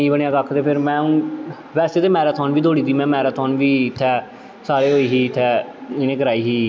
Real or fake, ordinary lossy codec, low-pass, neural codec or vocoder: real; none; none; none